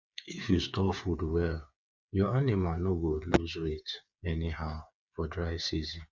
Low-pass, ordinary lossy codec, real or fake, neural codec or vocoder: 7.2 kHz; none; fake; codec, 16 kHz, 8 kbps, FreqCodec, smaller model